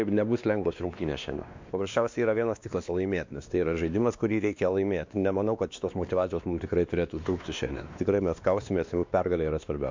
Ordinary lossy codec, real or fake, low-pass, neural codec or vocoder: MP3, 64 kbps; fake; 7.2 kHz; codec, 16 kHz, 2 kbps, X-Codec, WavLM features, trained on Multilingual LibriSpeech